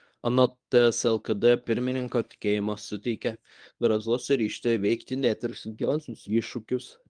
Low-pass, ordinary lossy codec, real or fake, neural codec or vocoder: 9.9 kHz; Opus, 32 kbps; fake; codec, 24 kHz, 0.9 kbps, WavTokenizer, medium speech release version 1